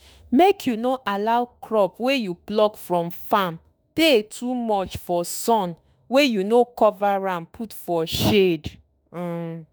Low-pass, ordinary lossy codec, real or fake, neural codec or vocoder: none; none; fake; autoencoder, 48 kHz, 32 numbers a frame, DAC-VAE, trained on Japanese speech